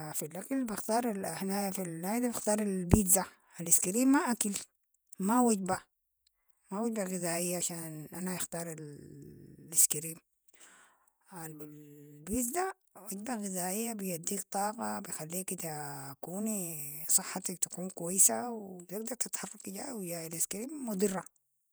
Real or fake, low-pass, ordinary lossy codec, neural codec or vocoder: fake; none; none; vocoder, 48 kHz, 128 mel bands, Vocos